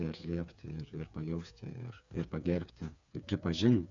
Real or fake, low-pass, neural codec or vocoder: fake; 7.2 kHz; codec, 16 kHz, 4 kbps, FreqCodec, smaller model